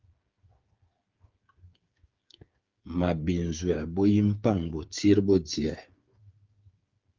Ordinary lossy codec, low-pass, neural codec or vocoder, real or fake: Opus, 32 kbps; 7.2 kHz; codec, 16 kHz, 8 kbps, FreqCodec, smaller model; fake